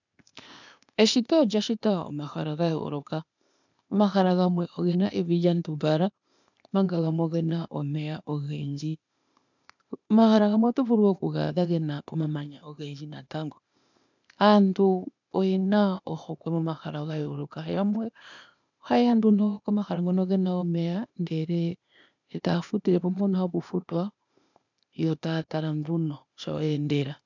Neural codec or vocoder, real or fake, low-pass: codec, 16 kHz, 0.8 kbps, ZipCodec; fake; 7.2 kHz